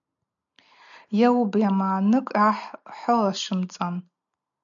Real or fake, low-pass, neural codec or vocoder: real; 7.2 kHz; none